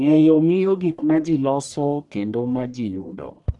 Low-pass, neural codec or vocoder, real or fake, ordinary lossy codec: 10.8 kHz; codec, 44.1 kHz, 1.7 kbps, Pupu-Codec; fake; none